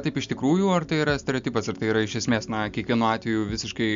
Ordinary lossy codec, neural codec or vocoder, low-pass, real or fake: MP3, 64 kbps; none; 7.2 kHz; real